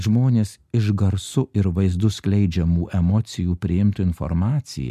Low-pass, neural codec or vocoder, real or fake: 14.4 kHz; none; real